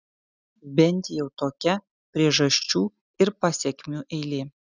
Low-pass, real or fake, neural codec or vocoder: 7.2 kHz; real; none